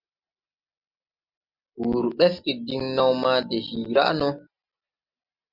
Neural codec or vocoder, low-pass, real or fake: none; 5.4 kHz; real